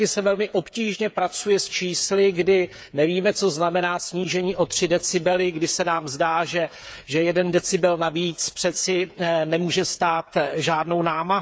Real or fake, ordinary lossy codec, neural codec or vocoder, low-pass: fake; none; codec, 16 kHz, 8 kbps, FreqCodec, smaller model; none